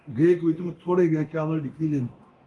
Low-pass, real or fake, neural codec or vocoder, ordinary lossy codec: 10.8 kHz; fake; codec, 24 kHz, 0.9 kbps, DualCodec; Opus, 24 kbps